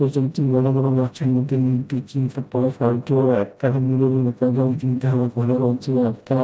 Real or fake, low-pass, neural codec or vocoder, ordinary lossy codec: fake; none; codec, 16 kHz, 0.5 kbps, FreqCodec, smaller model; none